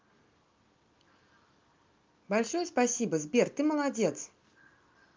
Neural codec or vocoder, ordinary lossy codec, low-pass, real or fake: none; Opus, 24 kbps; 7.2 kHz; real